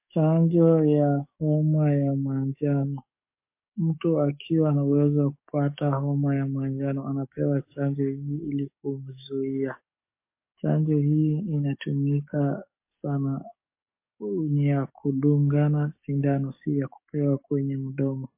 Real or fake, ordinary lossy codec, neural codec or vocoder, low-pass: fake; MP3, 24 kbps; codec, 44.1 kHz, 7.8 kbps, DAC; 3.6 kHz